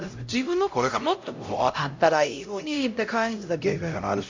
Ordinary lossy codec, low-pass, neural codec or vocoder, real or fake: MP3, 48 kbps; 7.2 kHz; codec, 16 kHz, 0.5 kbps, X-Codec, HuBERT features, trained on LibriSpeech; fake